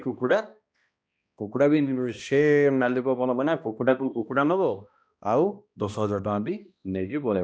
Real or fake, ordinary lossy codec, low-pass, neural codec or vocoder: fake; none; none; codec, 16 kHz, 1 kbps, X-Codec, HuBERT features, trained on balanced general audio